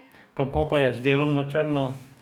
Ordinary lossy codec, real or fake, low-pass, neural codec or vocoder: none; fake; 19.8 kHz; codec, 44.1 kHz, 2.6 kbps, DAC